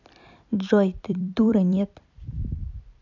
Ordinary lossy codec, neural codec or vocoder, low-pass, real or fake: none; vocoder, 44.1 kHz, 128 mel bands every 256 samples, BigVGAN v2; 7.2 kHz; fake